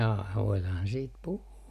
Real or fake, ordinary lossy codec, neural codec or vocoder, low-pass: real; none; none; 14.4 kHz